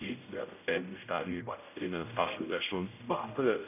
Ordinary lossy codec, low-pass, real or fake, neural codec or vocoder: none; 3.6 kHz; fake; codec, 16 kHz, 0.5 kbps, X-Codec, HuBERT features, trained on general audio